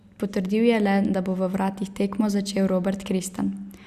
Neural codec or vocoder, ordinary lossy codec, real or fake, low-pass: none; Opus, 64 kbps; real; 14.4 kHz